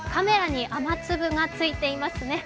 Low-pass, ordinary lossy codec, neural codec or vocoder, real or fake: none; none; none; real